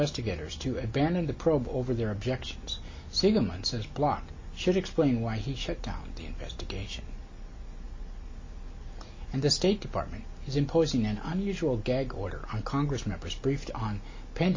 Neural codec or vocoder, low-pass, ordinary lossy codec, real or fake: none; 7.2 kHz; MP3, 32 kbps; real